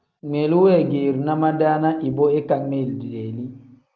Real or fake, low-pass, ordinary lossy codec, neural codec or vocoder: real; 7.2 kHz; Opus, 24 kbps; none